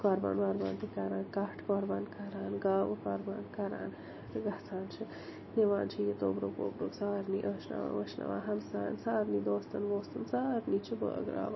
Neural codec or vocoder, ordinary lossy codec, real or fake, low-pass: none; MP3, 24 kbps; real; 7.2 kHz